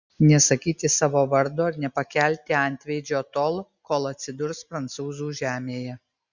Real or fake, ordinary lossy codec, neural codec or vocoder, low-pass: real; Opus, 64 kbps; none; 7.2 kHz